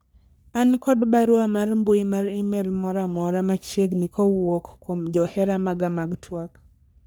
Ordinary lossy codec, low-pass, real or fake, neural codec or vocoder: none; none; fake; codec, 44.1 kHz, 3.4 kbps, Pupu-Codec